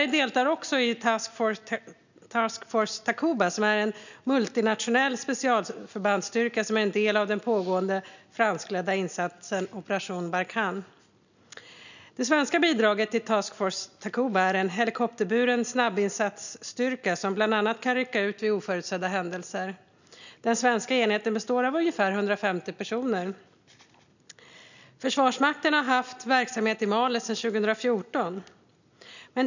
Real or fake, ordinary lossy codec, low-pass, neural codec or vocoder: real; none; 7.2 kHz; none